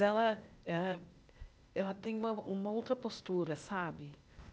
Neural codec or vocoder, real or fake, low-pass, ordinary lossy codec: codec, 16 kHz, 0.8 kbps, ZipCodec; fake; none; none